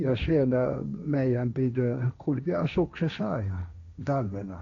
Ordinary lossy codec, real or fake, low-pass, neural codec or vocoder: none; fake; 7.2 kHz; codec, 16 kHz, 1.1 kbps, Voila-Tokenizer